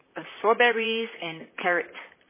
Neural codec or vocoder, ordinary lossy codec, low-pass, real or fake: vocoder, 44.1 kHz, 128 mel bands, Pupu-Vocoder; MP3, 16 kbps; 3.6 kHz; fake